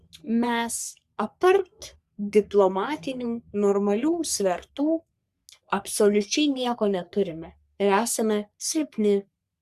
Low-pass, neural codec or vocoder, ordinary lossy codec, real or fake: 14.4 kHz; codec, 44.1 kHz, 3.4 kbps, Pupu-Codec; Opus, 64 kbps; fake